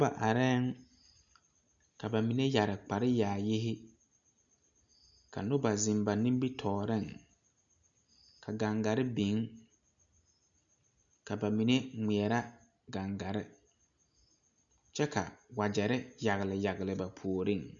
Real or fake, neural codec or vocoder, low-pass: real; none; 7.2 kHz